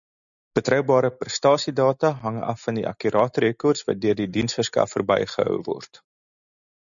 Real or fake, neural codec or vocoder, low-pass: real; none; 7.2 kHz